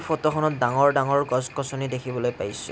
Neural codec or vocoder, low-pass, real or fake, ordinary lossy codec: none; none; real; none